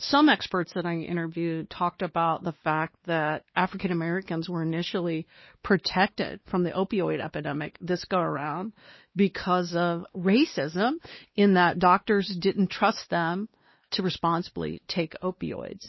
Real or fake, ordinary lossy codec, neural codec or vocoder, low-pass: fake; MP3, 24 kbps; codec, 16 kHz, 2 kbps, X-Codec, WavLM features, trained on Multilingual LibriSpeech; 7.2 kHz